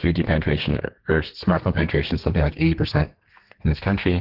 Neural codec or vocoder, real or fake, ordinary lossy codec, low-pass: codec, 32 kHz, 1.9 kbps, SNAC; fake; Opus, 16 kbps; 5.4 kHz